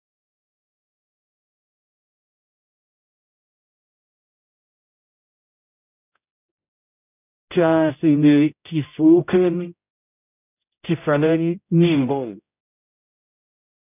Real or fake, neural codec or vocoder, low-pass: fake; codec, 16 kHz, 0.5 kbps, X-Codec, HuBERT features, trained on general audio; 3.6 kHz